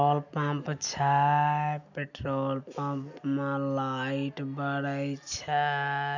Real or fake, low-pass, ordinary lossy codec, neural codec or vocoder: real; 7.2 kHz; none; none